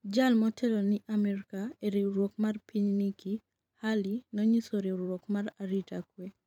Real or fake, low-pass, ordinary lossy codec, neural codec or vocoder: fake; 19.8 kHz; none; vocoder, 44.1 kHz, 128 mel bands every 512 samples, BigVGAN v2